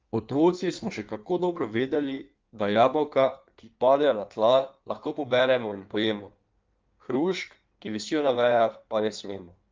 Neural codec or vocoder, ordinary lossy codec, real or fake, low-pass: codec, 16 kHz in and 24 kHz out, 1.1 kbps, FireRedTTS-2 codec; Opus, 24 kbps; fake; 7.2 kHz